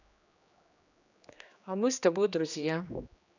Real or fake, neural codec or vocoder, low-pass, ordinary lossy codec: fake; codec, 16 kHz, 2 kbps, X-Codec, HuBERT features, trained on general audio; 7.2 kHz; none